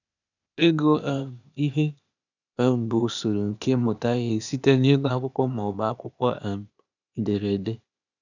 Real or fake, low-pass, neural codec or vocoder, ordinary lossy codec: fake; 7.2 kHz; codec, 16 kHz, 0.8 kbps, ZipCodec; none